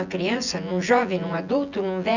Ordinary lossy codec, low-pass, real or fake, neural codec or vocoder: none; 7.2 kHz; fake; vocoder, 24 kHz, 100 mel bands, Vocos